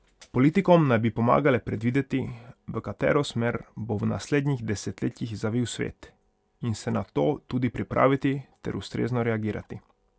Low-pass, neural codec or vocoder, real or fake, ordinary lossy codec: none; none; real; none